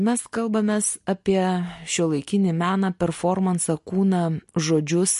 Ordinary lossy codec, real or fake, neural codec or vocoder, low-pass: MP3, 48 kbps; real; none; 14.4 kHz